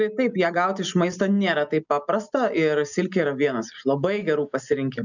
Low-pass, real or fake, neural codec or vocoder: 7.2 kHz; real; none